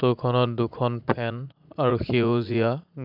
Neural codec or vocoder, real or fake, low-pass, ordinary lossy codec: vocoder, 44.1 kHz, 80 mel bands, Vocos; fake; 5.4 kHz; none